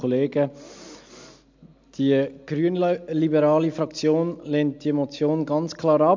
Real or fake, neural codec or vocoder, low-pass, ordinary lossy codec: real; none; 7.2 kHz; MP3, 64 kbps